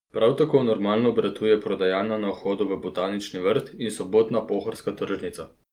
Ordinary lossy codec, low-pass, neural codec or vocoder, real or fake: Opus, 32 kbps; 19.8 kHz; none; real